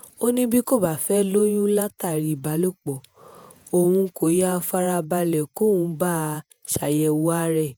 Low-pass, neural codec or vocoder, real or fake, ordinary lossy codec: none; vocoder, 48 kHz, 128 mel bands, Vocos; fake; none